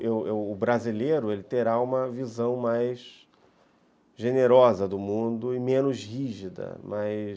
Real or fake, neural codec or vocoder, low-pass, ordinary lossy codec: real; none; none; none